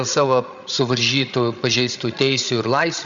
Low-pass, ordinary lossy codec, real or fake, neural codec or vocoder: 7.2 kHz; Opus, 64 kbps; fake; codec, 16 kHz, 16 kbps, FreqCodec, larger model